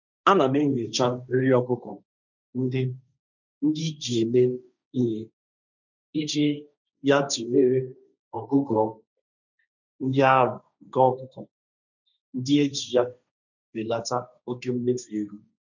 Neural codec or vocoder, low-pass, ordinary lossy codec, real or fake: codec, 16 kHz, 1.1 kbps, Voila-Tokenizer; 7.2 kHz; none; fake